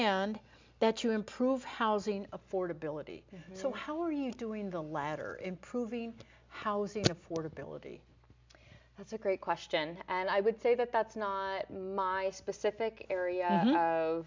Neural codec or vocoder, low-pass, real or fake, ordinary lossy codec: none; 7.2 kHz; real; MP3, 64 kbps